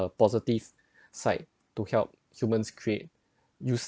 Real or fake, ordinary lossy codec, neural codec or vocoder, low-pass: real; none; none; none